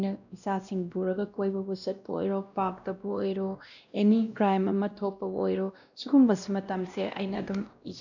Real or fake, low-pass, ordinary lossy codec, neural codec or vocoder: fake; 7.2 kHz; none; codec, 16 kHz, 1 kbps, X-Codec, WavLM features, trained on Multilingual LibriSpeech